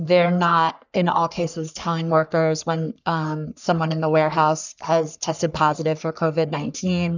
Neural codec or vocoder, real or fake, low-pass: codec, 44.1 kHz, 3.4 kbps, Pupu-Codec; fake; 7.2 kHz